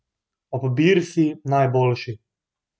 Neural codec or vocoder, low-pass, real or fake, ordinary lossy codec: none; none; real; none